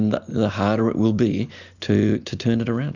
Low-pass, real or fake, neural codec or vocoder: 7.2 kHz; real; none